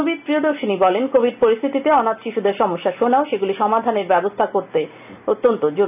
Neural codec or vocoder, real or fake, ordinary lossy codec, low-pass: none; real; none; 3.6 kHz